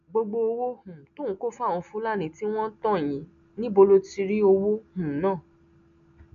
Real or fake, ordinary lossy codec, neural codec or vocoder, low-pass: real; none; none; 7.2 kHz